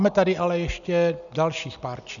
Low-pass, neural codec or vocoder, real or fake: 7.2 kHz; none; real